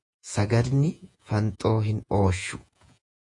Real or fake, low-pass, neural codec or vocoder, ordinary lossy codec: fake; 10.8 kHz; vocoder, 48 kHz, 128 mel bands, Vocos; AAC, 64 kbps